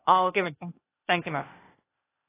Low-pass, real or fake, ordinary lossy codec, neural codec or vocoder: 3.6 kHz; fake; AAC, 16 kbps; codec, 16 kHz, 0.8 kbps, ZipCodec